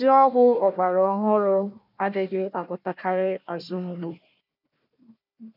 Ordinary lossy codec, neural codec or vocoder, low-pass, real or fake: none; codec, 16 kHz, 1 kbps, FunCodec, trained on Chinese and English, 50 frames a second; 5.4 kHz; fake